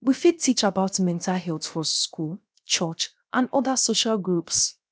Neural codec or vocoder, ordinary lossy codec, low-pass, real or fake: codec, 16 kHz, 0.7 kbps, FocalCodec; none; none; fake